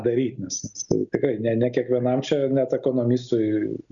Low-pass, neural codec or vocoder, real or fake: 7.2 kHz; none; real